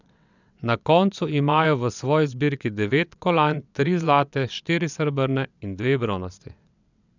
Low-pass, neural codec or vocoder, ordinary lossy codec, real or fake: 7.2 kHz; vocoder, 22.05 kHz, 80 mel bands, WaveNeXt; none; fake